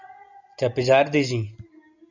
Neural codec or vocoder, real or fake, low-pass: none; real; 7.2 kHz